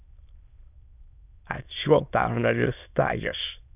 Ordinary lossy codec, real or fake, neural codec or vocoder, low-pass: none; fake; autoencoder, 22.05 kHz, a latent of 192 numbers a frame, VITS, trained on many speakers; 3.6 kHz